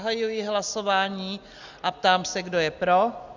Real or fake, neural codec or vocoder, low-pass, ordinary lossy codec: real; none; 7.2 kHz; Opus, 64 kbps